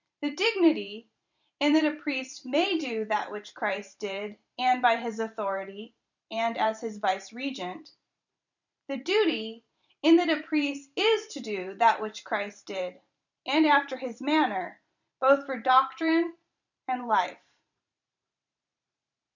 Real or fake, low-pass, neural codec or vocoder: fake; 7.2 kHz; vocoder, 44.1 kHz, 128 mel bands every 512 samples, BigVGAN v2